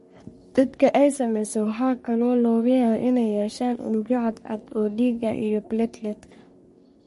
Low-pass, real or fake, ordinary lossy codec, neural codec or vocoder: 14.4 kHz; fake; MP3, 48 kbps; codec, 44.1 kHz, 3.4 kbps, Pupu-Codec